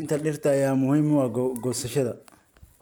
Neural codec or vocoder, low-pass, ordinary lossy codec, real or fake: none; none; none; real